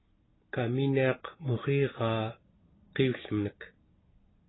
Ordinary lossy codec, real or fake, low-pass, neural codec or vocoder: AAC, 16 kbps; real; 7.2 kHz; none